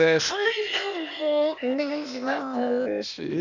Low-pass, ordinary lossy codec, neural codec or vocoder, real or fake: 7.2 kHz; none; codec, 16 kHz, 0.8 kbps, ZipCodec; fake